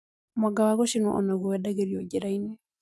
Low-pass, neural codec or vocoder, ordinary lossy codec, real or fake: none; none; none; real